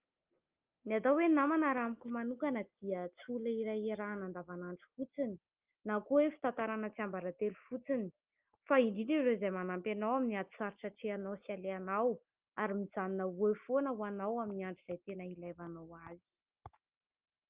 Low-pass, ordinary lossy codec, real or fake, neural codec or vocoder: 3.6 kHz; Opus, 32 kbps; real; none